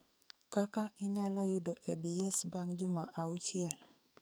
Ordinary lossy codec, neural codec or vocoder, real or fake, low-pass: none; codec, 44.1 kHz, 2.6 kbps, SNAC; fake; none